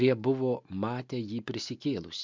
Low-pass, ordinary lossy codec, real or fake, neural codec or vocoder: 7.2 kHz; MP3, 64 kbps; real; none